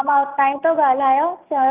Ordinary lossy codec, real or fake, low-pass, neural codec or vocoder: Opus, 24 kbps; real; 3.6 kHz; none